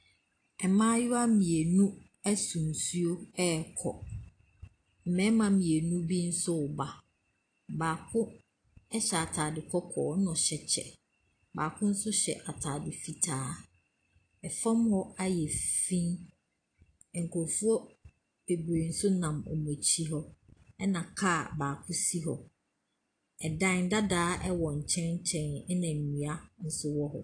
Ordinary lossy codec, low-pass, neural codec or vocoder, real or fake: AAC, 48 kbps; 9.9 kHz; none; real